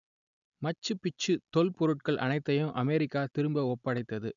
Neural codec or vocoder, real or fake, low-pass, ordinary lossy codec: none; real; 7.2 kHz; none